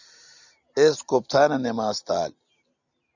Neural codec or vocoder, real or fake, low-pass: none; real; 7.2 kHz